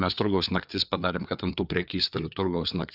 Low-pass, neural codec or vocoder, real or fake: 5.4 kHz; codec, 16 kHz, 4 kbps, FreqCodec, larger model; fake